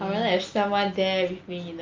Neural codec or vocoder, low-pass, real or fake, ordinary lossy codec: none; 7.2 kHz; real; Opus, 32 kbps